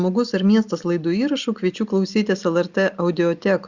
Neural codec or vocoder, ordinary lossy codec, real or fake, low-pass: none; Opus, 64 kbps; real; 7.2 kHz